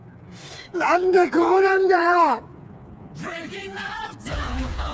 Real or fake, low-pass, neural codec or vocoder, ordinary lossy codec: fake; none; codec, 16 kHz, 4 kbps, FreqCodec, smaller model; none